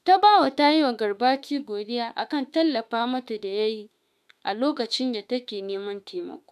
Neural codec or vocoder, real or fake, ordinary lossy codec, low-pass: autoencoder, 48 kHz, 32 numbers a frame, DAC-VAE, trained on Japanese speech; fake; none; 14.4 kHz